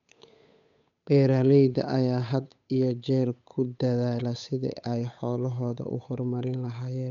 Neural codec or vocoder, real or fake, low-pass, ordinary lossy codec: codec, 16 kHz, 8 kbps, FunCodec, trained on Chinese and English, 25 frames a second; fake; 7.2 kHz; none